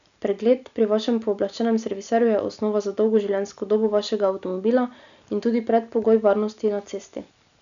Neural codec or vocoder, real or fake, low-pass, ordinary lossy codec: none; real; 7.2 kHz; none